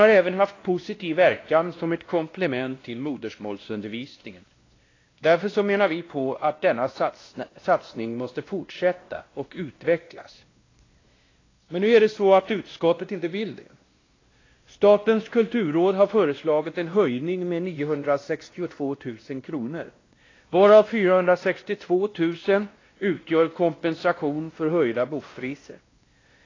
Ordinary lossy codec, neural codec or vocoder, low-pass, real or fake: AAC, 32 kbps; codec, 16 kHz, 1 kbps, X-Codec, WavLM features, trained on Multilingual LibriSpeech; 7.2 kHz; fake